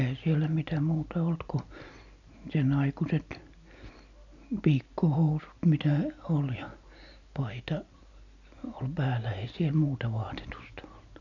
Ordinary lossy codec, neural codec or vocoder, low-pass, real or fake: none; none; 7.2 kHz; real